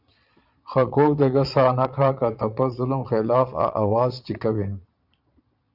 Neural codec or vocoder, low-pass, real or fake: vocoder, 22.05 kHz, 80 mel bands, Vocos; 5.4 kHz; fake